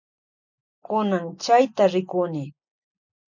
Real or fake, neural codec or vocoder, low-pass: real; none; 7.2 kHz